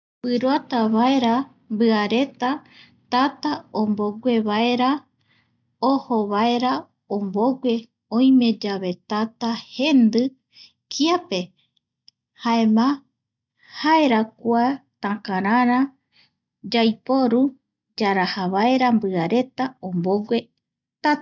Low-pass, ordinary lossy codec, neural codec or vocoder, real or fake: 7.2 kHz; none; none; real